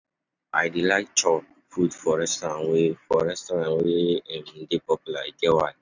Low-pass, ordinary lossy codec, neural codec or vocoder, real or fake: 7.2 kHz; none; none; real